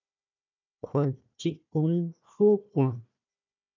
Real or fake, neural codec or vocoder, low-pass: fake; codec, 16 kHz, 1 kbps, FunCodec, trained on Chinese and English, 50 frames a second; 7.2 kHz